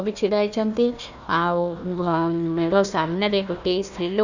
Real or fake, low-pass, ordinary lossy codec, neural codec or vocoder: fake; 7.2 kHz; none; codec, 16 kHz, 1 kbps, FunCodec, trained on Chinese and English, 50 frames a second